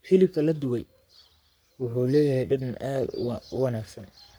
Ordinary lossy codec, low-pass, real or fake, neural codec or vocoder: none; none; fake; codec, 44.1 kHz, 3.4 kbps, Pupu-Codec